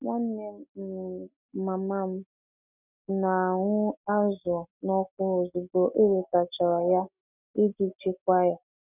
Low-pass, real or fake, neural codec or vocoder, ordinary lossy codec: 3.6 kHz; real; none; none